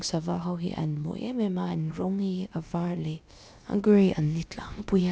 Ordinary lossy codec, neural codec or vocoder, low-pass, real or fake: none; codec, 16 kHz, about 1 kbps, DyCAST, with the encoder's durations; none; fake